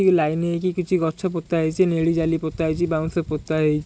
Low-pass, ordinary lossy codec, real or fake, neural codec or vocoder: none; none; real; none